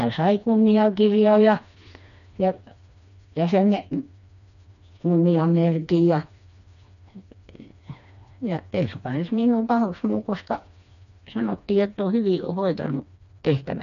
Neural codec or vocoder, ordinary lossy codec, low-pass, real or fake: codec, 16 kHz, 2 kbps, FreqCodec, smaller model; none; 7.2 kHz; fake